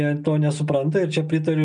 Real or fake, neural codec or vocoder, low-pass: real; none; 9.9 kHz